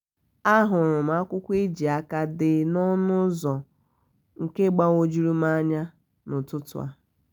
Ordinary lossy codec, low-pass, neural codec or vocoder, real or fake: none; none; none; real